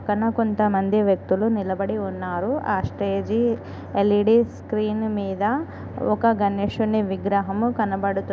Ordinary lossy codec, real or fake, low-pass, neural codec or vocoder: none; real; none; none